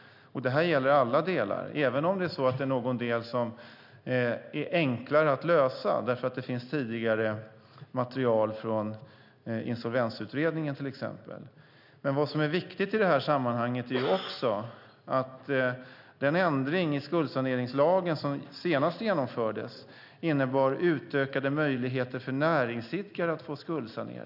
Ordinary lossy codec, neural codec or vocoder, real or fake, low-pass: none; none; real; 5.4 kHz